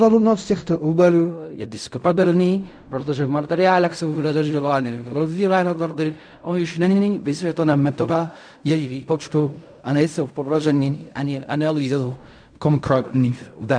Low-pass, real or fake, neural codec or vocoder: 9.9 kHz; fake; codec, 16 kHz in and 24 kHz out, 0.4 kbps, LongCat-Audio-Codec, fine tuned four codebook decoder